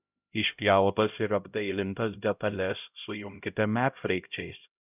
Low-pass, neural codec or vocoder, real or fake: 3.6 kHz; codec, 16 kHz, 0.5 kbps, X-Codec, HuBERT features, trained on LibriSpeech; fake